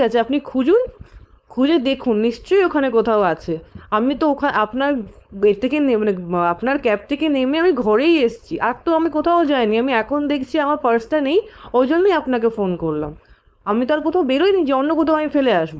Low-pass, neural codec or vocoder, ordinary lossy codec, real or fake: none; codec, 16 kHz, 4.8 kbps, FACodec; none; fake